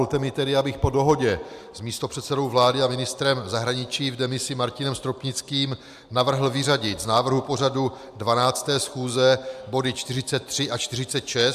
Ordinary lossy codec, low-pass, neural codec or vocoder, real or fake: AAC, 96 kbps; 14.4 kHz; none; real